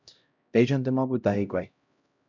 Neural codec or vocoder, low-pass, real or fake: codec, 16 kHz, 0.5 kbps, X-Codec, HuBERT features, trained on LibriSpeech; 7.2 kHz; fake